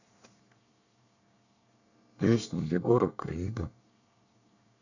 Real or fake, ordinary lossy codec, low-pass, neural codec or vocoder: fake; none; 7.2 kHz; codec, 24 kHz, 1 kbps, SNAC